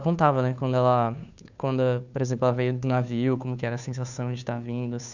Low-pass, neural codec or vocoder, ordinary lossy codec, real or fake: 7.2 kHz; codec, 16 kHz, 2 kbps, FunCodec, trained on Chinese and English, 25 frames a second; none; fake